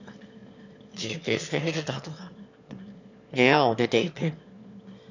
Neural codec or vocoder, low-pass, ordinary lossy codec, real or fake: autoencoder, 22.05 kHz, a latent of 192 numbers a frame, VITS, trained on one speaker; 7.2 kHz; none; fake